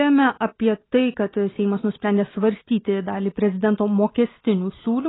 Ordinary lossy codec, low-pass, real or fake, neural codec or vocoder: AAC, 16 kbps; 7.2 kHz; real; none